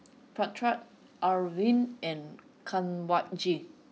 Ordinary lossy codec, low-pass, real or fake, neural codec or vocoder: none; none; real; none